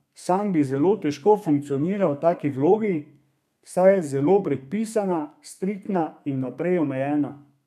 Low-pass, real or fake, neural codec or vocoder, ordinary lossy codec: 14.4 kHz; fake; codec, 32 kHz, 1.9 kbps, SNAC; none